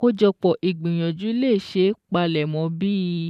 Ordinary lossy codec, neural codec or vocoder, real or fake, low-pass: none; vocoder, 44.1 kHz, 128 mel bands every 512 samples, BigVGAN v2; fake; 14.4 kHz